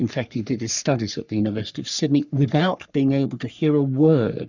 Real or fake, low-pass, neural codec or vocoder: fake; 7.2 kHz; codec, 44.1 kHz, 3.4 kbps, Pupu-Codec